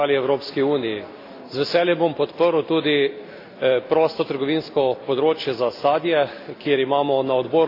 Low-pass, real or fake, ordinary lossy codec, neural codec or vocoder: 5.4 kHz; real; AAC, 32 kbps; none